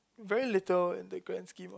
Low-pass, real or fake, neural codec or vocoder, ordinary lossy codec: none; real; none; none